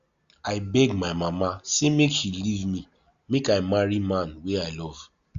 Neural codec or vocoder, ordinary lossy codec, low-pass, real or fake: none; Opus, 64 kbps; 7.2 kHz; real